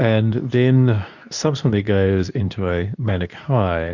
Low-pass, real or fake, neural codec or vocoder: 7.2 kHz; fake; codec, 24 kHz, 0.9 kbps, WavTokenizer, medium speech release version 2